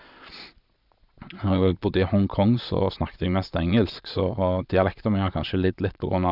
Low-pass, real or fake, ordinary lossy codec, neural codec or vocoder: 5.4 kHz; fake; none; vocoder, 22.05 kHz, 80 mel bands, Vocos